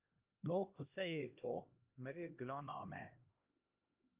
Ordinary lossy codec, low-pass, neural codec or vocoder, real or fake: Opus, 32 kbps; 3.6 kHz; codec, 16 kHz, 1 kbps, X-Codec, HuBERT features, trained on LibriSpeech; fake